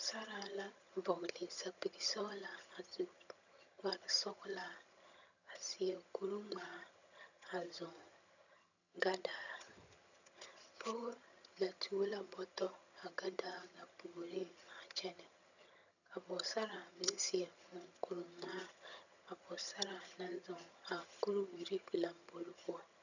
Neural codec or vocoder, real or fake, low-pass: vocoder, 22.05 kHz, 80 mel bands, HiFi-GAN; fake; 7.2 kHz